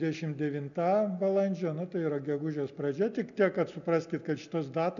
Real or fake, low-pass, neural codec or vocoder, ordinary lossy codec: real; 7.2 kHz; none; MP3, 96 kbps